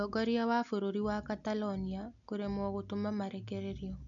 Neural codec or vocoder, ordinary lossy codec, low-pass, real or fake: none; none; 7.2 kHz; real